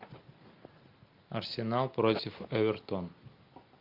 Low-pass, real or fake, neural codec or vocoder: 5.4 kHz; real; none